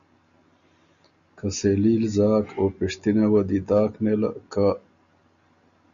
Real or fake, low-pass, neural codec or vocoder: real; 7.2 kHz; none